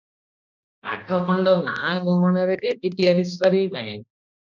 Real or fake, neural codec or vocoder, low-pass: fake; codec, 16 kHz, 1 kbps, X-Codec, HuBERT features, trained on balanced general audio; 7.2 kHz